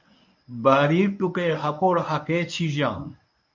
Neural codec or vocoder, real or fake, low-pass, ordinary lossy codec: codec, 24 kHz, 0.9 kbps, WavTokenizer, medium speech release version 1; fake; 7.2 kHz; MP3, 48 kbps